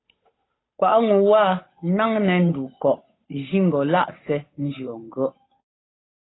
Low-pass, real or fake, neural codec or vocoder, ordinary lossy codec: 7.2 kHz; fake; codec, 16 kHz, 8 kbps, FunCodec, trained on Chinese and English, 25 frames a second; AAC, 16 kbps